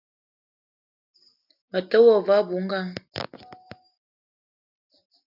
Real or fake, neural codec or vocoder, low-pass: real; none; 5.4 kHz